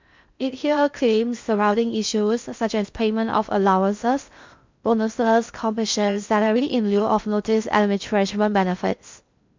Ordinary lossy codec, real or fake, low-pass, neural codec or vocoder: MP3, 64 kbps; fake; 7.2 kHz; codec, 16 kHz in and 24 kHz out, 0.6 kbps, FocalCodec, streaming, 2048 codes